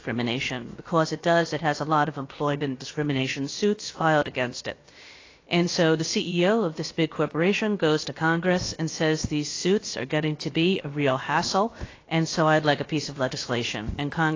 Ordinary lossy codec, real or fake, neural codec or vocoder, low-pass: AAC, 32 kbps; fake; codec, 16 kHz, about 1 kbps, DyCAST, with the encoder's durations; 7.2 kHz